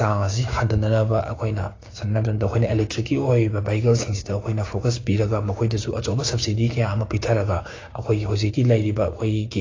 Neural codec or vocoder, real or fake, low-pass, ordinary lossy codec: codec, 16 kHz, 6 kbps, DAC; fake; 7.2 kHz; AAC, 32 kbps